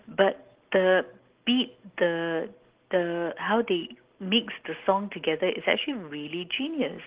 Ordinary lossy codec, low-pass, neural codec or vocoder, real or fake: Opus, 16 kbps; 3.6 kHz; none; real